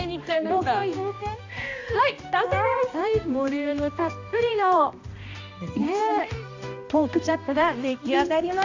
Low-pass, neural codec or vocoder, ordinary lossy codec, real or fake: 7.2 kHz; codec, 16 kHz, 1 kbps, X-Codec, HuBERT features, trained on balanced general audio; AAC, 48 kbps; fake